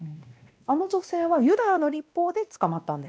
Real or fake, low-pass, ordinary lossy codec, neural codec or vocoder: fake; none; none; codec, 16 kHz, 1 kbps, X-Codec, WavLM features, trained on Multilingual LibriSpeech